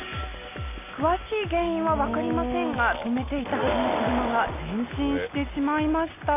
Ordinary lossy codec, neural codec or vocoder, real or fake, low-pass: AAC, 24 kbps; none; real; 3.6 kHz